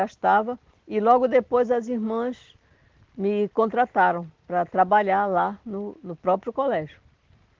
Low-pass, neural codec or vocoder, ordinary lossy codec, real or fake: 7.2 kHz; none; Opus, 16 kbps; real